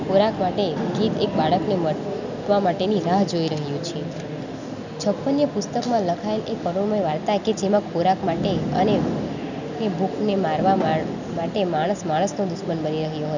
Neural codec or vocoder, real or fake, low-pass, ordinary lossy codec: none; real; 7.2 kHz; none